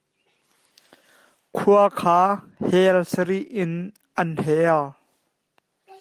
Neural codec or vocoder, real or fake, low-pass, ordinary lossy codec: none; real; 14.4 kHz; Opus, 32 kbps